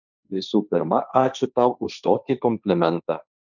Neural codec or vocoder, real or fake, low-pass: codec, 16 kHz, 1.1 kbps, Voila-Tokenizer; fake; 7.2 kHz